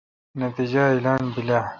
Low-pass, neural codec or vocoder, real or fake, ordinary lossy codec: 7.2 kHz; none; real; Opus, 64 kbps